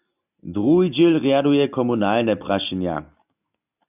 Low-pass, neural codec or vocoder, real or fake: 3.6 kHz; none; real